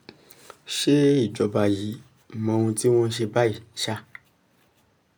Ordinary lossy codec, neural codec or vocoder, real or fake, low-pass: none; none; real; none